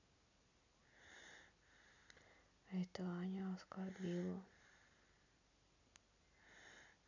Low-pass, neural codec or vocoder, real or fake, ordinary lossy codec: 7.2 kHz; none; real; none